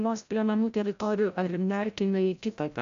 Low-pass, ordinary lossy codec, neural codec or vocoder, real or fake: 7.2 kHz; AAC, 96 kbps; codec, 16 kHz, 0.5 kbps, FreqCodec, larger model; fake